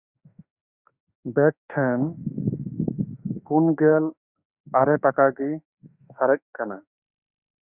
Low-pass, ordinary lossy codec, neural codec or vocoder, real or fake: 3.6 kHz; Opus, 64 kbps; autoencoder, 48 kHz, 32 numbers a frame, DAC-VAE, trained on Japanese speech; fake